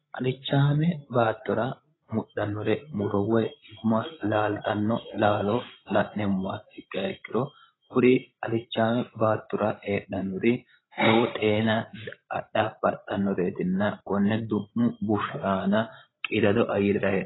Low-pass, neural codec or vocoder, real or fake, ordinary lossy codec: 7.2 kHz; codec, 16 kHz, 16 kbps, FreqCodec, larger model; fake; AAC, 16 kbps